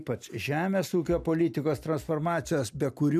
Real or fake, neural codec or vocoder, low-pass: real; none; 14.4 kHz